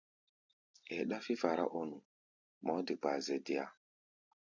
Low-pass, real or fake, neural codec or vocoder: 7.2 kHz; fake; vocoder, 44.1 kHz, 128 mel bands every 256 samples, BigVGAN v2